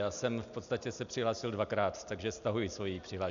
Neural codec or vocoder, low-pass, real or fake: none; 7.2 kHz; real